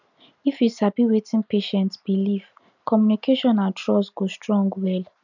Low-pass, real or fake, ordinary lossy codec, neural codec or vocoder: 7.2 kHz; real; none; none